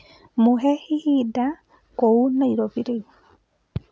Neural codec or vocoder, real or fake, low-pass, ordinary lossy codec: none; real; none; none